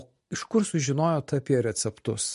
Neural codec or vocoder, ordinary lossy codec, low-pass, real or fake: none; MP3, 48 kbps; 14.4 kHz; real